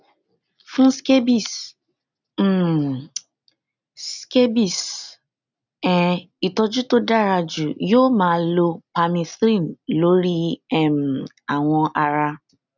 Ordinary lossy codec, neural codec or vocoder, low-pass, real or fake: none; none; 7.2 kHz; real